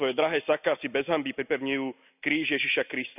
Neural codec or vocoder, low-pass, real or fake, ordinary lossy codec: none; 3.6 kHz; real; none